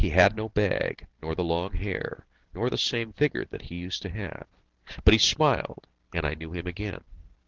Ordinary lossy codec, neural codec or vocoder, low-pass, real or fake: Opus, 16 kbps; none; 7.2 kHz; real